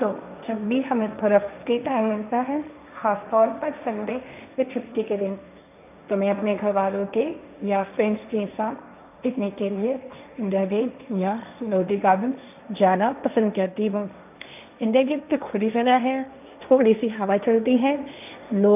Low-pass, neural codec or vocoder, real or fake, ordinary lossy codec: 3.6 kHz; codec, 16 kHz, 1.1 kbps, Voila-Tokenizer; fake; none